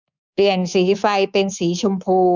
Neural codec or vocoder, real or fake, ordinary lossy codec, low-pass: codec, 24 kHz, 3.1 kbps, DualCodec; fake; none; 7.2 kHz